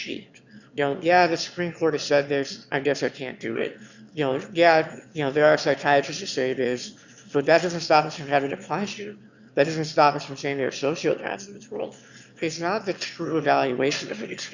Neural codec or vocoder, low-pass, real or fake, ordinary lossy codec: autoencoder, 22.05 kHz, a latent of 192 numbers a frame, VITS, trained on one speaker; 7.2 kHz; fake; Opus, 64 kbps